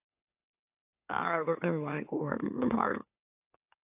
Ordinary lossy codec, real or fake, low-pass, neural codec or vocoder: AAC, 32 kbps; fake; 3.6 kHz; autoencoder, 44.1 kHz, a latent of 192 numbers a frame, MeloTTS